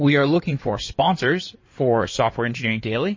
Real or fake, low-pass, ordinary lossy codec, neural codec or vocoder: fake; 7.2 kHz; MP3, 32 kbps; codec, 16 kHz in and 24 kHz out, 2.2 kbps, FireRedTTS-2 codec